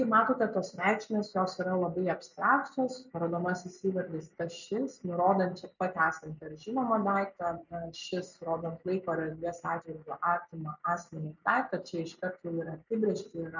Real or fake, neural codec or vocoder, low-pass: real; none; 7.2 kHz